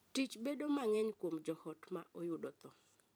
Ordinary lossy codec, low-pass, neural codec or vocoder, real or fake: none; none; vocoder, 44.1 kHz, 128 mel bands every 512 samples, BigVGAN v2; fake